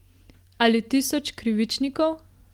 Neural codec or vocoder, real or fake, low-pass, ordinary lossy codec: none; real; 19.8 kHz; Opus, 24 kbps